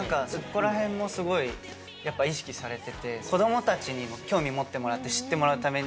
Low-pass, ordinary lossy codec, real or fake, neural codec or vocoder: none; none; real; none